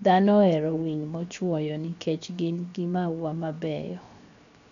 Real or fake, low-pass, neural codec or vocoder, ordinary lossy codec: fake; 7.2 kHz; codec, 16 kHz, 0.7 kbps, FocalCodec; none